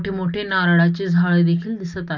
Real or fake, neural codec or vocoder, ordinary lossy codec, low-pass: real; none; none; 7.2 kHz